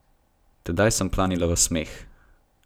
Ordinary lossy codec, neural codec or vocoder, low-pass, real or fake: none; none; none; real